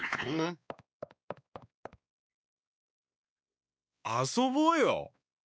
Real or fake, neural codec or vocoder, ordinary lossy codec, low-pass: fake; codec, 16 kHz, 2 kbps, X-Codec, WavLM features, trained on Multilingual LibriSpeech; none; none